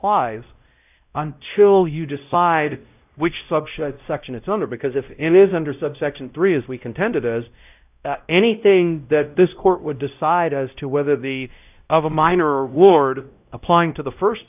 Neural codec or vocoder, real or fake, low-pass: codec, 16 kHz, 0.5 kbps, X-Codec, WavLM features, trained on Multilingual LibriSpeech; fake; 3.6 kHz